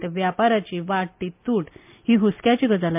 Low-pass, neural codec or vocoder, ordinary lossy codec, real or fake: 3.6 kHz; none; MP3, 32 kbps; real